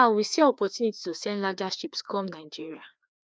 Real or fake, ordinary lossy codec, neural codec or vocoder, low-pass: fake; none; codec, 16 kHz, 2 kbps, FreqCodec, larger model; none